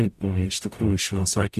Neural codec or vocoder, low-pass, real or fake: codec, 44.1 kHz, 0.9 kbps, DAC; 14.4 kHz; fake